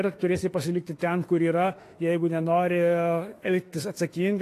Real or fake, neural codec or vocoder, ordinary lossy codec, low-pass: fake; autoencoder, 48 kHz, 32 numbers a frame, DAC-VAE, trained on Japanese speech; AAC, 48 kbps; 14.4 kHz